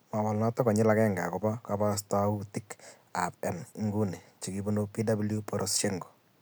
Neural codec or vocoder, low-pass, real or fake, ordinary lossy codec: none; none; real; none